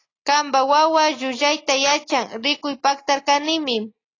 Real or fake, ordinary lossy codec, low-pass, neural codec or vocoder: real; AAC, 32 kbps; 7.2 kHz; none